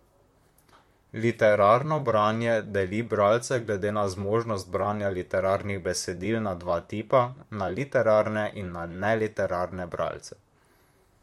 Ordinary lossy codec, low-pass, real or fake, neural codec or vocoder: MP3, 64 kbps; 19.8 kHz; fake; vocoder, 44.1 kHz, 128 mel bands, Pupu-Vocoder